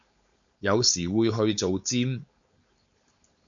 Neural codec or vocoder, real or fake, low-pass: codec, 16 kHz, 4.8 kbps, FACodec; fake; 7.2 kHz